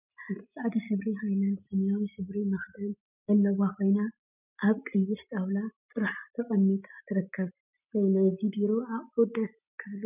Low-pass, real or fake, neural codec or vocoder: 3.6 kHz; real; none